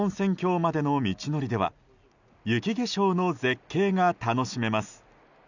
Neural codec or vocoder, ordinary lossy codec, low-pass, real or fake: none; none; 7.2 kHz; real